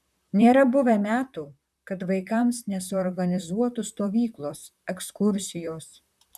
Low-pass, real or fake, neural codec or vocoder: 14.4 kHz; fake; vocoder, 44.1 kHz, 128 mel bands, Pupu-Vocoder